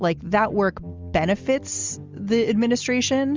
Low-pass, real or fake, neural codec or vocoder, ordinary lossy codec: 7.2 kHz; real; none; Opus, 32 kbps